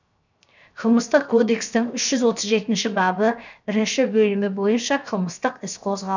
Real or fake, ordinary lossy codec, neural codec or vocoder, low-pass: fake; none; codec, 16 kHz, 0.7 kbps, FocalCodec; 7.2 kHz